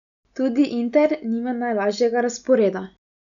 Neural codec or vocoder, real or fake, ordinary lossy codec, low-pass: none; real; none; 7.2 kHz